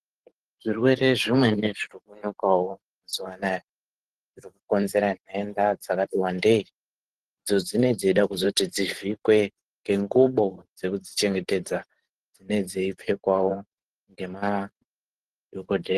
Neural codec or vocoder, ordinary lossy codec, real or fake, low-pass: vocoder, 44.1 kHz, 128 mel bands every 512 samples, BigVGAN v2; Opus, 16 kbps; fake; 14.4 kHz